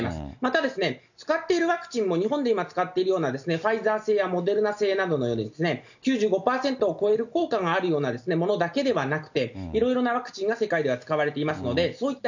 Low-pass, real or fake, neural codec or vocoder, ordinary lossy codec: 7.2 kHz; real; none; none